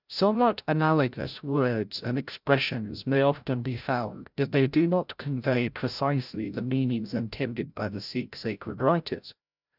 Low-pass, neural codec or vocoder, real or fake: 5.4 kHz; codec, 16 kHz, 0.5 kbps, FreqCodec, larger model; fake